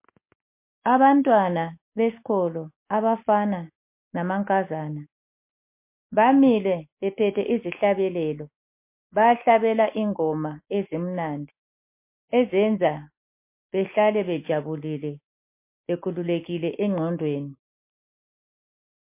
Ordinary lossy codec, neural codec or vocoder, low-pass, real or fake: MP3, 24 kbps; none; 3.6 kHz; real